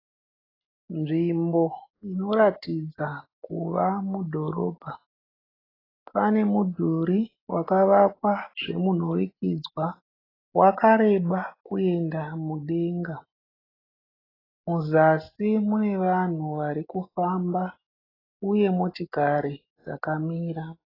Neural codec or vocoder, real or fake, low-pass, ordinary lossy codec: none; real; 5.4 kHz; AAC, 24 kbps